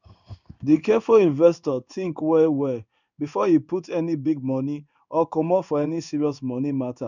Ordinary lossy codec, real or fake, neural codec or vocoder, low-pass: none; fake; codec, 16 kHz in and 24 kHz out, 1 kbps, XY-Tokenizer; 7.2 kHz